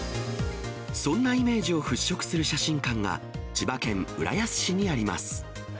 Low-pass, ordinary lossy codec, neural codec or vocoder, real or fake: none; none; none; real